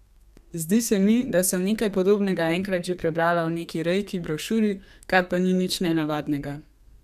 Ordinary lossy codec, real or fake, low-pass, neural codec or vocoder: none; fake; 14.4 kHz; codec, 32 kHz, 1.9 kbps, SNAC